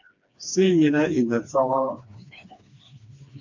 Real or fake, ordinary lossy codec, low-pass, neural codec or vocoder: fake; MP3, 48 kbps; 7.2 kHz; codec, 16 kHz, 2 kbps, FreqCodec, smaller model